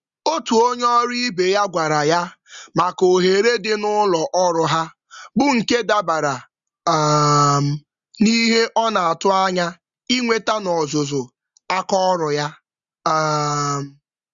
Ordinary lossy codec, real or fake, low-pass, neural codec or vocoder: Opus, 64 kbps; real; 7.2 kHz; none